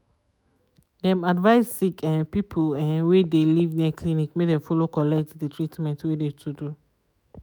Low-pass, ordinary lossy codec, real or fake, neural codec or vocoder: none; none; fake; autoencoder, 48 kHz, 128 numbers a frame, DAC-VAE, trained on Japanese speech